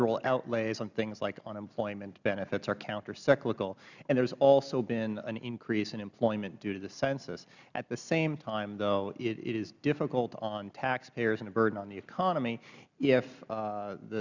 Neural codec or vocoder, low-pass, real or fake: none; 7.2 kHz; real